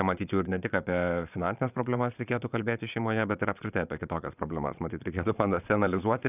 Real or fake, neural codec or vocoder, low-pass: fake; vocoder, 22.05 kHz, 80 mel bands, Vocos; 3.6 kHz